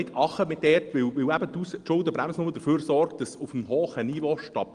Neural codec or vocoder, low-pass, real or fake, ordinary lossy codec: none; 9.9 kHz; real; Opus, 24 kbps